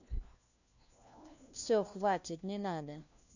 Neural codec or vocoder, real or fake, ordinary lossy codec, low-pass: codec, 16 kHz, 1 kbps, FunCodec, trained on LibriTTS, 50 frames a second; fake; none; 7.2 kHz